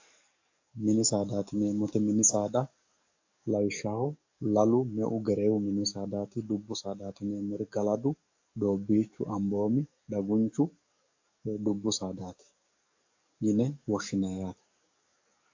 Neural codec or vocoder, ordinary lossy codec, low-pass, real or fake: codec, 44.1 kHz, 7.8 kbps, DAC; AAC, 48 kbps; 7.2 kHz; fake